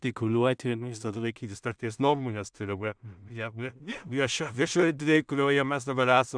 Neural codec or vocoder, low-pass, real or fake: codec, 16 kHz in and 24 kHz out, 0.4 kbps, LongCat-Audio-Codec, two codebook decoder; 9.9 kHz; fake